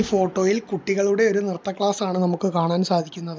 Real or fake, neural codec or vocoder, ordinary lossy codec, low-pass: real; none; none; none